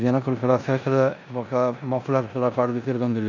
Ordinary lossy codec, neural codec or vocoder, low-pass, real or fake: none; codec, 16 kHz in and 24 kHz out, 0.9 kbps, LongCat-Audio-Codec, four codebook decoder; 7.2 kHz; fake